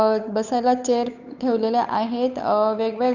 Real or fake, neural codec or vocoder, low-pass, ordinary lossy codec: fake; codec, 16 kHz, 8 kbps, FunCodec, trained on Chinese and English, 25 frames a second; 7.2 kHz; none